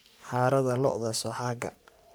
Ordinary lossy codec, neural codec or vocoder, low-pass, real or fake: none; codec, 44.1 kHz, 3.4 kbps, Pupu-Codec; none; fake